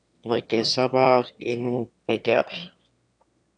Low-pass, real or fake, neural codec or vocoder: 9.9 kHz; fake; autoencoder, 22.05 kHz, a latent of 192 numbers a frame, VITS, trained on one speaker